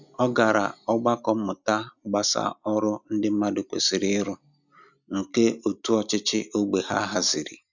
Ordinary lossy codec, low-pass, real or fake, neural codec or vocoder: none; 7.2 kHz; real; none